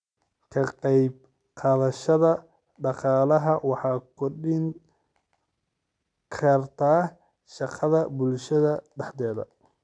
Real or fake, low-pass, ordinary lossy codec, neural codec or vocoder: real; 9.9 kHz; none; none